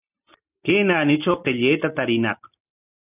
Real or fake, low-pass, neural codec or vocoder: real; 3.6 kHz; none